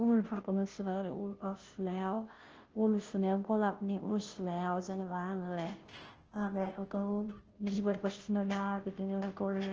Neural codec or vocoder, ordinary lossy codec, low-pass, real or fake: codec, 16 kHz, 0.5 kbps, FunCodec, trained on Chinese and English, 25 frames a second; Opus, 16 kbps; 7.2 kHz; fake